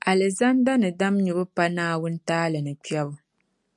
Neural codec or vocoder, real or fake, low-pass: none; real; 10.8 kHz